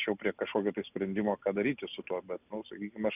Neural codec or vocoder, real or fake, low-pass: none; real; 3.6 kHz